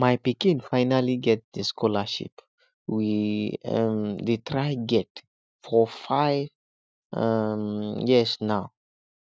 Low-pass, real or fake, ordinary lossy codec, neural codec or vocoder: none; real; none; none